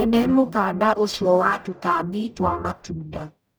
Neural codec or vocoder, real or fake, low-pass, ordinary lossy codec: codec, 44.1 kHz, 0.9 kbps, DAC; fake; none; none